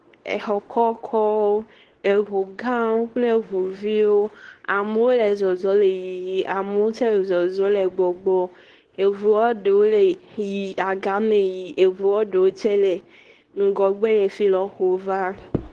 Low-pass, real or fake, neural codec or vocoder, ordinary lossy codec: 10.8 kHz; fake; codec, 24 kHz, 0.9 kbps, WavTokenizer, medium speech release version 2; Opus, 16 kbps